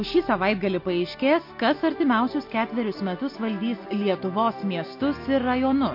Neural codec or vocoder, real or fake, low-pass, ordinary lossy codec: none; real; 5.4 kHz; MP3, 32 kbps